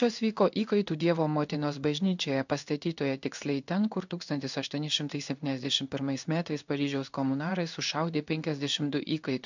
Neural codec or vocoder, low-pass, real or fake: codec, 16 kHz in and 24 kHz out, 1 kbps, XY-Tokenizer; 7.2 kHz; fake